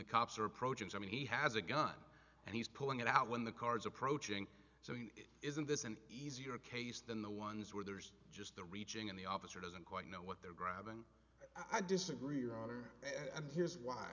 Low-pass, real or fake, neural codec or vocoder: 7.2 kHz; real; none